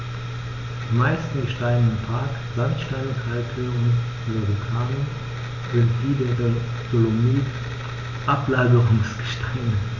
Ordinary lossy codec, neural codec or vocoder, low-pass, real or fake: none; none; 7.2 kHz; real